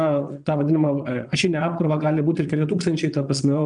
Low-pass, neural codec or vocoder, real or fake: 9.9 kHz; vocoder, 22.05 kHz, 80 mel bands, Vocos; fake